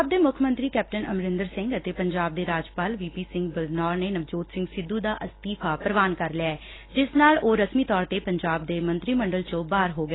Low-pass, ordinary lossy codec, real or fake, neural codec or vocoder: 7.2 kHz; AAC, 16 kbps; real; none